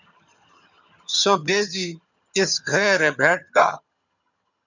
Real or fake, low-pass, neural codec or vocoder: fake; 7.2 kHz; vocoder, 22.05 kHz, 80 mel bands, HiFi-GAN